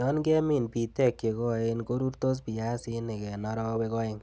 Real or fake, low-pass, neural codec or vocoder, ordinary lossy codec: real; none; none; none